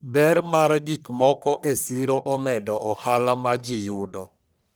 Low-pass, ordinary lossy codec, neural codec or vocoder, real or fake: none; none; codec, 44.1 kHz, 1.7 kbps, Pupu-Codec; fake